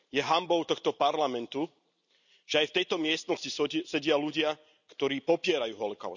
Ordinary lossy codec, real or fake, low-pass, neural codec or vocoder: none; real; 7.2 kHz; none